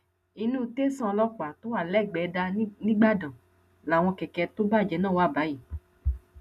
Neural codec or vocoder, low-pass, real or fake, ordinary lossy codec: none; 14.4 kHz; real; none